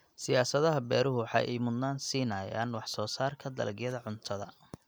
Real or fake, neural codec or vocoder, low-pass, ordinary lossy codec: real; none; none; none